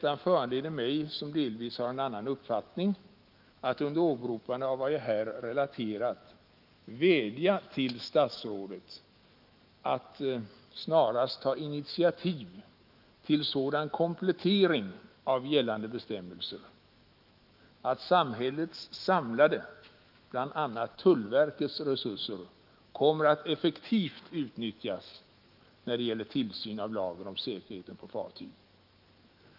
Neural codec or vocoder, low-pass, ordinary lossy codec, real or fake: autoencoder, 48 kHz, 128 numbers a frame, DAC-VAE, trained on Japanese speech; 5.4 kHz; Opus, 24 kbps; fake